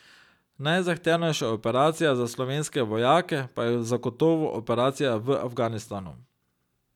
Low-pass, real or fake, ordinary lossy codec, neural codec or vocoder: 19.8 kHz; real; none; none